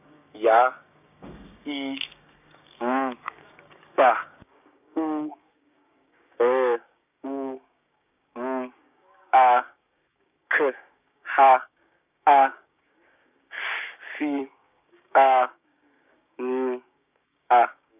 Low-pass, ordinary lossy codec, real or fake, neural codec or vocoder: 3.6 kHz; none; fake; codec, 16 kHz, 6 kbps, DAC